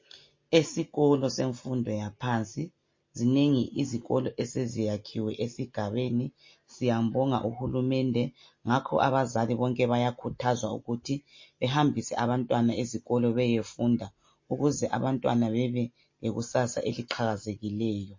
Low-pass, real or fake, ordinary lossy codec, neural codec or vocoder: 7.2 kHz; real; MP3, 32 kbps; none